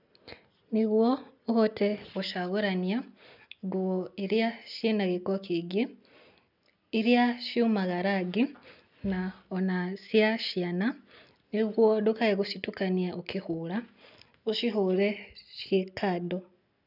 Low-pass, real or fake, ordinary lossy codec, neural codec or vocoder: 5.4 kHz; real; none; none